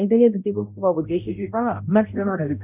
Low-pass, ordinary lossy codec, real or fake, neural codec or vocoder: 3.6 kHz; none; fake; codec, 16 kHz, 0.5 kbps, X-Codec, HuBERT features, trained on balanced general audio